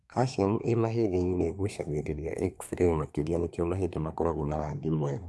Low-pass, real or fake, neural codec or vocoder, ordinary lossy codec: none; fake; codec, 24 kHz, 1 kbps, SNAC; none